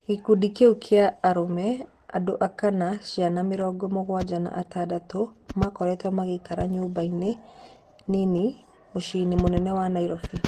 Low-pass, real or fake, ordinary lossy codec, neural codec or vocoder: 14.4 kHz; real; Opus, 16 kbps; none